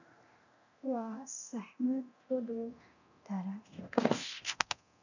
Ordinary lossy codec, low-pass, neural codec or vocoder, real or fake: none; 7.2 kHz; codec, 24 kHz, 0.9 kbps, DualCodec; fake